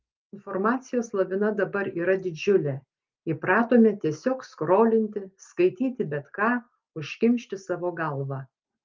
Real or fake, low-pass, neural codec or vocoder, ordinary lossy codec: real; 7.2 kHz; none; Opus, 32 kbps